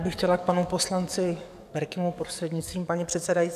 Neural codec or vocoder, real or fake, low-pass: codec, 44.1 kHz, 7.8 kbps, DAC; fake; 14.4 kHz